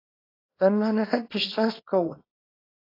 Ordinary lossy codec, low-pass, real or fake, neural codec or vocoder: AAC, 24 kbps; 5.4 kHz; fake; codec, 24 kHz, 0.9 kbps, WavTokenizer, small release